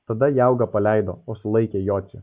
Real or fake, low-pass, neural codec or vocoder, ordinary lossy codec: real; 3.6 kHz; none; Opus, 32 kbps